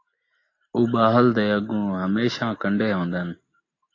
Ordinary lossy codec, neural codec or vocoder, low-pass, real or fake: AAC, 32 kbps; none; 7.2 kHz; real